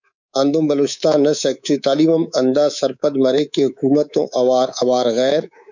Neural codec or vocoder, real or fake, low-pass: codec, 24 kHz, 3.1 kbps, DualCodec; fake; 7.2 kHz